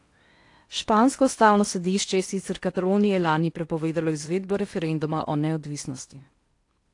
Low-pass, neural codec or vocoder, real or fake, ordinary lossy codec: 10.8 kHz; codec, 16 kHz in and 24 kHz out, 0.8 kbps, FocalCodec, streaming, 65536 codes; fake; AAC, 48 kbps